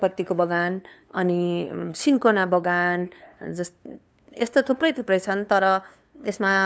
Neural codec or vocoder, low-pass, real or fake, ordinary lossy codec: codec, 16 kHz, 2 kbps, FunCodec, trained on LibriTTS, 25 frames a second; none; fake; none